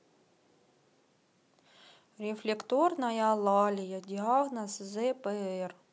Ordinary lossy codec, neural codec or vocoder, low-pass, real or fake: none; none; none; real